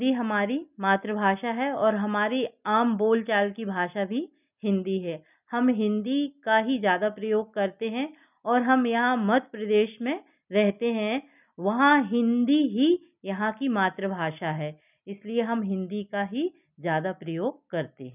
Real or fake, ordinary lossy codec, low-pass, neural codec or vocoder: real; none; 3.6 kHz; none